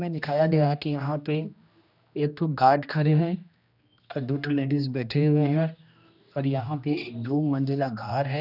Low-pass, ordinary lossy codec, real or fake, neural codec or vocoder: 5.4 kHz; none; fake; codec, 16 kHz, 1 kbps, X-Codec, HuBERT features, trained on general audio